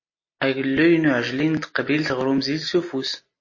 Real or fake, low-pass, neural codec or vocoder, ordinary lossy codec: real; 7.2 kHz; none; MP3, 32 kbps